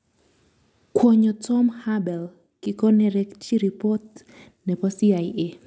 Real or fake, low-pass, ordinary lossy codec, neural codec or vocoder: real; none; none; none